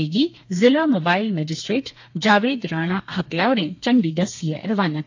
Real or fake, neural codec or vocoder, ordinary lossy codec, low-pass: fake; codec, 32 kHz, 1.9 kbps, SNAC; AAC, 48 kbps; 7.2 kHz